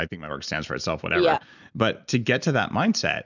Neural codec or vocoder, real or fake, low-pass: none; real; 7.2 kHz